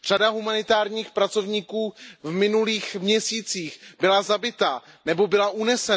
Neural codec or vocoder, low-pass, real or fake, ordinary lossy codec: none; none; real; none